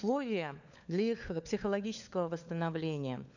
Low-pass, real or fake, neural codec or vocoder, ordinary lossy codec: 7.2 kHz; fake; codec, 16 kHz, 4 kbps, FunCodec, trained on LibriTTS, 50 frames a second; none